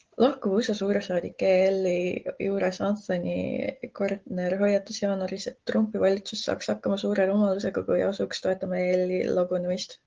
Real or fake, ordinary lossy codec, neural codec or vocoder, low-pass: real; Opus, 16 kbps; none; 7.2 kHz